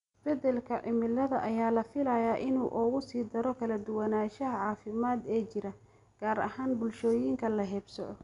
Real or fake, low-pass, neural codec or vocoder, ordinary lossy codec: real; 10.8 kHz; none; none